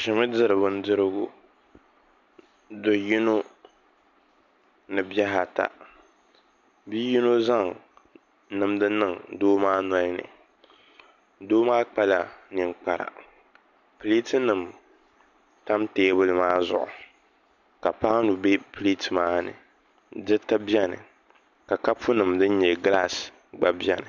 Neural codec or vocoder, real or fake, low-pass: none; real; 7.2 kHz